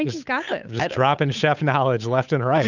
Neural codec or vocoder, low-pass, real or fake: codec, 16 kHz, 8 kbps, FunCodec, trained on Chinese and English, 25 frames a second; 7.2 kHz; fake